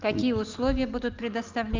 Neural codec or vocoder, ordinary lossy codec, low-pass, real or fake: none; Opus, 24 kbps; 7.2 kHz; real